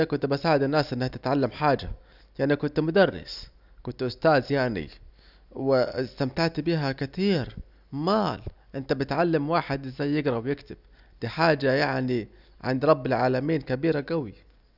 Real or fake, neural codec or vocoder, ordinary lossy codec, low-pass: real; none; none; 5.4 kHz